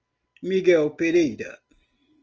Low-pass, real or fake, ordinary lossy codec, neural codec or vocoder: 7.2 kHz; real; Opus, 24 kbps; none